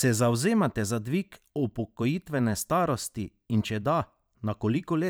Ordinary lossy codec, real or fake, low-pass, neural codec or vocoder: none; fake; none; vocoder, 44.1 kHz, 128 mel bands every 512 samples, BigVGAN v2